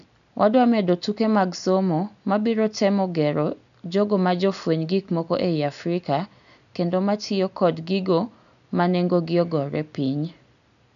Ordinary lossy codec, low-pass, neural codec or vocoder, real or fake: none; 7.2 kHz; none; real